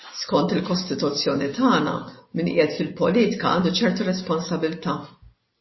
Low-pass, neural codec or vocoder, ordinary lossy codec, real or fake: 7.2 kHz; none; MP3, 24 kbps; real